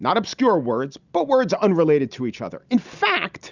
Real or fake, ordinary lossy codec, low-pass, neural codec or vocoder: real; Opus, 64 kbps; 7.2 kHz; none